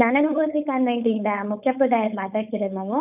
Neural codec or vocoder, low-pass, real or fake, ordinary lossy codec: codec, 16 kHz, 4.8 kbps, FACodec; 3.6 kHz; fake; none